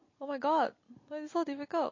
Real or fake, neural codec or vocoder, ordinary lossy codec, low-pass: real; none; MP3, 32 kbps; 7.2 kHz